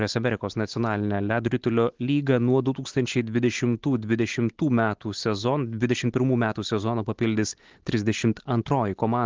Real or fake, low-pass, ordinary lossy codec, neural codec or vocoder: real; 7.2 kHz; Opus, 16 kbps; none